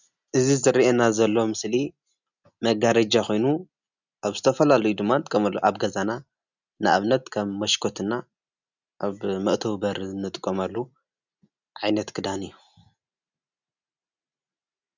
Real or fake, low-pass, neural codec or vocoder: real; 7.2 kHz; none